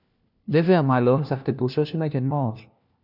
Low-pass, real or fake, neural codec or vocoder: 5.4 kHz; fake; codec, 16 kHz, 1 kbps, FunCodec, trained on LibriTTS, 50 frames a second